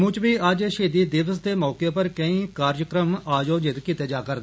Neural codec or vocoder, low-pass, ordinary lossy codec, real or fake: none; none; none; real